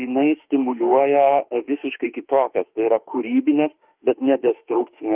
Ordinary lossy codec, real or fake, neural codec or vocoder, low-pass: Opus, 32 kbps; fake; autoencoder, 48 kHz, 32 numbers a frame, DAC-VAE, trained on Japanese speech; 3.6 kHz